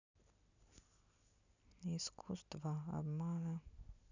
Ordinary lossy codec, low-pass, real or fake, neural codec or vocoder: none; 7.2 kHz; real; none